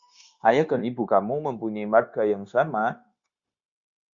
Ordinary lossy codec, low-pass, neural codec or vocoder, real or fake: Opus, 64 kbps; 7.2 kHz; codec, 16 kHz, 0.9 kbps, LongCat-Audio-Codec; fake